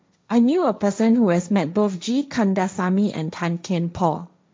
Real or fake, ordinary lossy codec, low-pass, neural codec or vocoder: fake; none; none; codec, 16 kHz, 1.1 kbps, Voila-Tokenizer